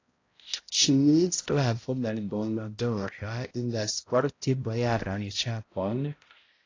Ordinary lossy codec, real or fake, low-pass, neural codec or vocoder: AAC, 32 kbps; fake; 7.2 kHz; codec, 16 kHz, 0.5 kbps, X-Codec, HuBERT features, trained on balanced general audio